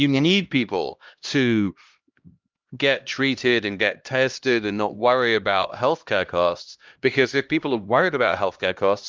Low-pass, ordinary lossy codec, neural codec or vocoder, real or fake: 7.2 kHz; Opus, 24 kbps; codec, 16 kHz, 1 kbps, X-Codec, HuBERT features, trained on LibriSpeech; fake